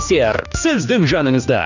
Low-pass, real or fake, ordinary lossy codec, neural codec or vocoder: 7.2 kHz; fake; none; codec, 16 kHz, 2 kbps, X-Codec, HuBERT features, trained on general audio